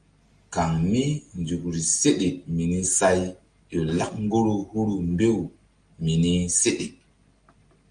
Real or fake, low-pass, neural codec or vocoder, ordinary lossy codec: real; 9.9 kHz; none; Opus, 32 kbps